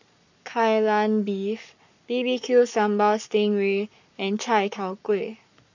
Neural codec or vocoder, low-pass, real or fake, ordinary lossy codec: codec, 44.1 kHz, 7.8 kbps, Pupu-Codec; 7.2 kHz; fake; none